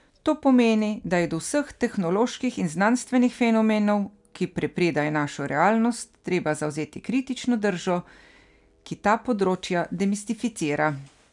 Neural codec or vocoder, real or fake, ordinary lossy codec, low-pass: none; real; none; 10.8 kHz